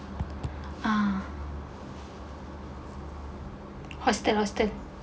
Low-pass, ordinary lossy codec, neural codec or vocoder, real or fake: none; none; none; real